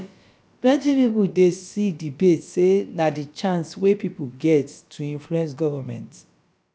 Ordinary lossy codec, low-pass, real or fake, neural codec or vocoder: none; none; fake; codec, 16 kHz, about 1 kbps, DyCAST, with the encoder's durations